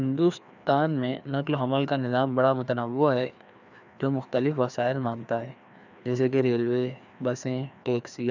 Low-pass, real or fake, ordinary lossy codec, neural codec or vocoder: 7.2 kHz; fake; none; codec, 16 kHz, 2 kbps, FreqCodec, larger model